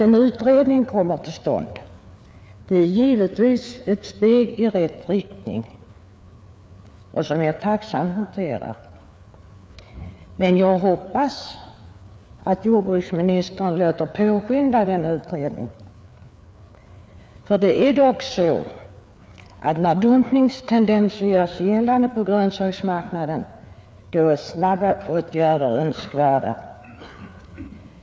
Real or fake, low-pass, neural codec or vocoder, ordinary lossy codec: fake; none; codec, 16 kHz, 4 kbps, FreqCodec, larger model; none